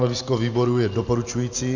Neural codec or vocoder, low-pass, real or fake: none; 7.2 kHz; real